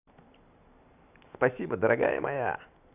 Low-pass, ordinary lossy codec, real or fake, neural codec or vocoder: 3.6 kHz; none; real; none